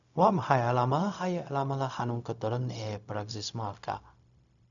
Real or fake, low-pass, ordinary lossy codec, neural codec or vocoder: fake; 7.2 kHz; none; codec, 16 kHz, 0.4 kbps, LongCat-Audio-Codec